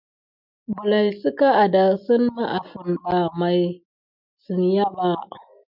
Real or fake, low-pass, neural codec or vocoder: real; 5.4 kHz; none